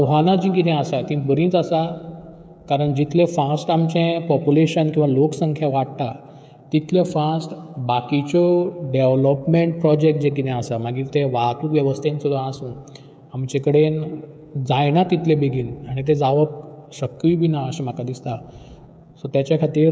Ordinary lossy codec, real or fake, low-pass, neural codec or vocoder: none; fake; none; codec, 16 kHz, 16 kbps, FreqCodec, smaller model